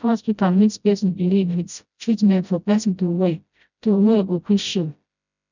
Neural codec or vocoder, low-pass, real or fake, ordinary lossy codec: codec, 16 kHz, 0.5 kbps, FreqCodec, smaller model; 7.2 kHz; fake; none